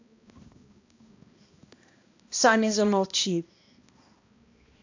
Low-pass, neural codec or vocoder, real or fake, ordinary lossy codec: 7.2 kHz; codec, 16 kHz, 1 kbps, X-Codec, HuBERT features, trained on balanced general audio; fake; AAC, 48 kbps